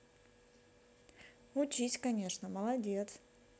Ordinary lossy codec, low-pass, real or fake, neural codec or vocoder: none; none; real; none